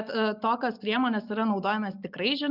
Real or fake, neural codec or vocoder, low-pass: real; none; 5.4 kHz